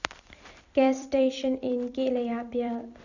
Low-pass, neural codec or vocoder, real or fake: 7.2 kHz; vocoder, 44.1 kHz, 128 mel bands every 256 samples, BigVGAN v2; fake